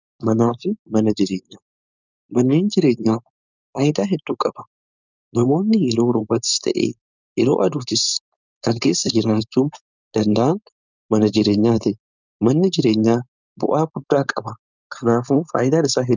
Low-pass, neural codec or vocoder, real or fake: 7.2 kHz; codec, 16 kHz, 4.8 kbps, FACodec; fake